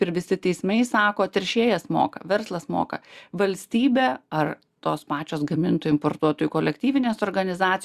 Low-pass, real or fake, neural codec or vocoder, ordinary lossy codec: 14.4 kHz; real; none; Opus, 64 kbps